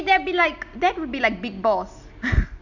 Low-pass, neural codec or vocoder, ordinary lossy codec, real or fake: 7.2 kHz; none; Opus, 64 kbps; real